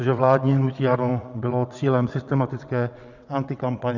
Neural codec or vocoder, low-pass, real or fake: vocoder, 22.05 kHz, 80 mel bands, WaveNeXt; 7.2 kHz; fake